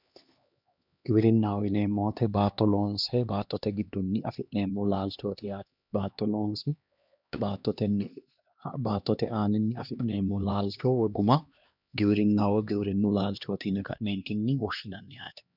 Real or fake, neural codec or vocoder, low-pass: fake; codec, 16 kHz, 2 kbps, X-Codec, HuBERT features, trained on LibriSpeech; 5.4 kHz